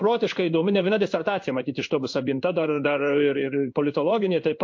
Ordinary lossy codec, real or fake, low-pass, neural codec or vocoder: MP3, 48 kbps; fake; 7.2 kHz; codec, 16 kHz in and 24 kHz out, 1 kbps, XY-Tokenizer